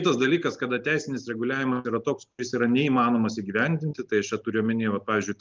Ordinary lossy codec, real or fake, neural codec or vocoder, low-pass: Opus, 32 kbps; real; none; 7.2 kHz